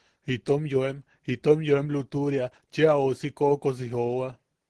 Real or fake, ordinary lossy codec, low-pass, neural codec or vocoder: real; Opus, 16 kbps; 10.8 kHz; none